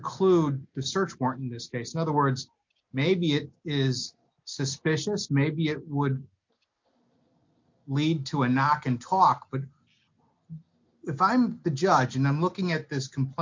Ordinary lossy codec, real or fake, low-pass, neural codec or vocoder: MP3, 48 kbps; real; 7.2 kHz; none